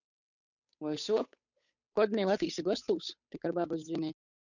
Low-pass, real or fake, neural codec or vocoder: 7.2 kHz; fake; codec, 16 kHz, 8 kbps, FunCodec, trained on Chinese and English, 25 frames a second